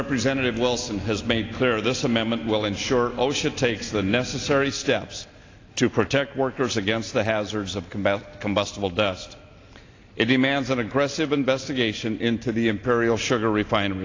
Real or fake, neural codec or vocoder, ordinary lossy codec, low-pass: real; none; AAC, 32 kbps; 7.2 kHz